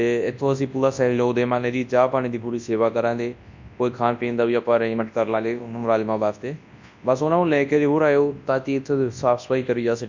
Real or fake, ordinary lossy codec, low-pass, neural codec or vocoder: fake; MP3, 64 kbps; 7.2 kHz; codec, 24 kHz, 0.9 kbps, WavTokenizer, large speech release